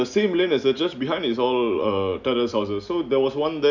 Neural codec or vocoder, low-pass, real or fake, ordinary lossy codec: none; 7.2 kHz; real; none